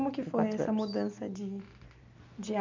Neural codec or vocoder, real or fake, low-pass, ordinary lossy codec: vocoder, 44.1 kHz, 128 mel bands every 256 samples, BigVGAN v2; fake; 7.2 kHz; MP3, 64 kbps